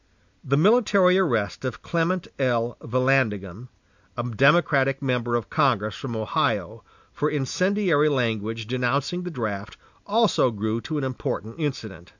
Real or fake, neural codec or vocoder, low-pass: real; none; 7.2 kHz